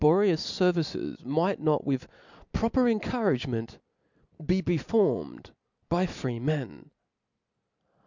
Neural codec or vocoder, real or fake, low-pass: none; real; 7.2 kHz